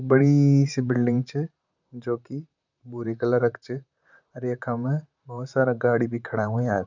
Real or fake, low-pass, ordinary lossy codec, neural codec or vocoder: fake; 7.2 kHz; none; vocoder, 44.1 kHz, 128 mel bands, Pupu-Vocoder